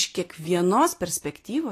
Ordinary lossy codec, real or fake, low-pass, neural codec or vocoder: AAC, 48 kbps; real; 14.4 kHz; none